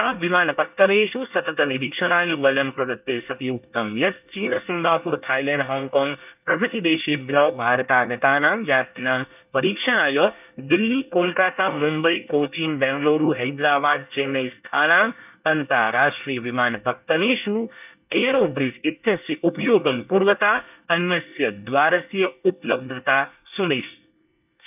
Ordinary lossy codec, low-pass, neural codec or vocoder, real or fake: none; 3.6 kHz; codec, 24 kHz, 1 kbps, SNAC; fake